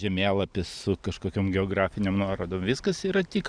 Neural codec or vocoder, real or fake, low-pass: none; real; 9.9 kHz